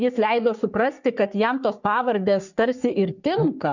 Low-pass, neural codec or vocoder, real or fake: 7.2 kHz; codec, 44.1 kHz, 3.4 kbps, Pupu-Codec; fake